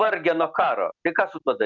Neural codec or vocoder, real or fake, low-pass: none; real; 7.2 kHz